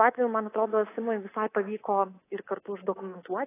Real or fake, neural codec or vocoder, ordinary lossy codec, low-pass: fake; codec, 24 kHz, 3.1 kbps, DualCodec; AAC, 16 kbps; 3.6 kHz